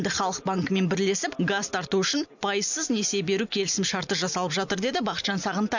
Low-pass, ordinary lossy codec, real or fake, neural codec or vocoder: 7.2 kHz; none; real; none